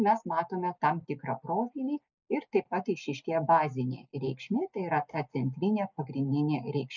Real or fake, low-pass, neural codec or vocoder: real; 7.2 kHz; none